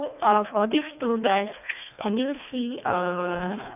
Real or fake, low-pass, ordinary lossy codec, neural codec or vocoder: fake; 3.6 kHz; none; codec, 24 kHz, 1.5 kbps, HILCodec